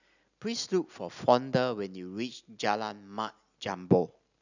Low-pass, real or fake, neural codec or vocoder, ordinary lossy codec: 7.2 kHz; real; none; none